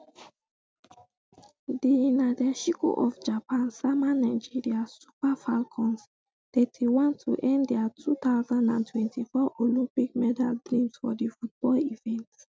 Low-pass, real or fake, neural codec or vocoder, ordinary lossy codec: none; real; none; none